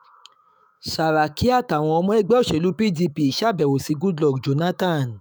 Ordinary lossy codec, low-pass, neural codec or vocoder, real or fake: none; none; autoencoder, 48 kHz, 128 numbers a frame, DAC-VAE, trained on Japanese speech; fake